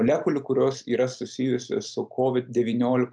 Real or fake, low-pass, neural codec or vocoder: real; 9.9 kHz; none